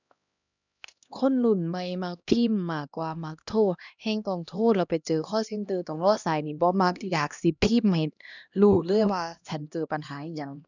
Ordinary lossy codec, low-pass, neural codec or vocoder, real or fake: none; 7.2 kHz; codec, 16 kHz, 1 kbps, X-Codec, HuBERT features, trained on LibriSpeech; fake